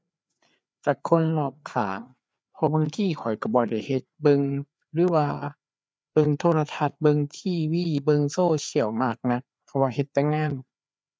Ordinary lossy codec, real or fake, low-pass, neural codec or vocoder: none; fake; none; codec, 16 kHz, 4 kbps, FreqCodec, larger model